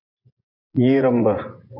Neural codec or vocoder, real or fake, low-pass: vocoder, 24 kHz, 100 mel bands, Vocos; fake; 5.4 kHz